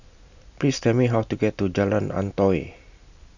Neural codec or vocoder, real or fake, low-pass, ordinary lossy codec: none; real; 7.2 kHz; none